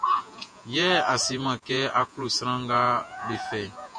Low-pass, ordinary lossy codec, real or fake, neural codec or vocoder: 9.9 kHz; MP3, 48 kbps; fake; vocoder, 48 kHz, 128 mel bands, Vocos